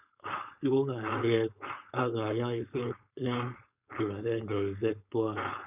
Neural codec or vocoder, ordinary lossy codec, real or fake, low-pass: codec, 16 kHz, 4.8 kbps, FACodec; none; fake; 3.6 kHz